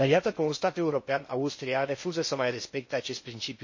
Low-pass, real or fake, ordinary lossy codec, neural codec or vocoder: 7.2 kHz; fake; MP3, 32 kbps; codec, 16 kHz in and 24 kHz out, 0.8 kbps, FocalCodec, streaming, 65536 codes